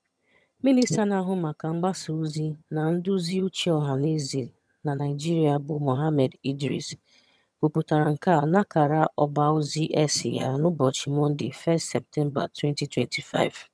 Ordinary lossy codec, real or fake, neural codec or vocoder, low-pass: none; fake; vocoder, 22.05 kHz, 80 mel bands, HiFi-GAN; none